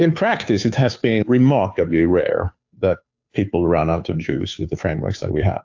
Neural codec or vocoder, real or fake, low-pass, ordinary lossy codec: codec, 16 kHz, 2 kbps, FunCodec, trained on Chinese and English, 25 frames a second; fake; 7.2 kHz; AAC, 48 kbps